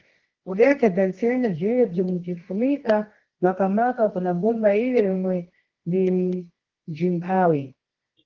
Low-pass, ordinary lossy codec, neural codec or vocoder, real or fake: 7.2 kHz; Opus, 16 kbps; codec, 24 kHz, 0.9 kbps, WavTokenizer, medium music audio release; fake